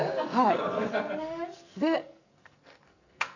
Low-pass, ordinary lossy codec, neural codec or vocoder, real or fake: 7.2 kHz; none; codec, 44.1 kHz, 2.6 kbps, SNAC; fake